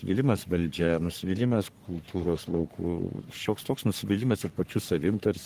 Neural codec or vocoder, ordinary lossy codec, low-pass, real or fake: codec, 44.1 kHz, 3.4 kbps, Pupu-Codec; Opus, 24 kbps; 14.4 kHz; fake